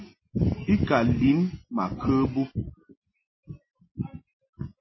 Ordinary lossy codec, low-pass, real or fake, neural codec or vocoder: MP3, 24 kbps; 7.2 kHz; real; none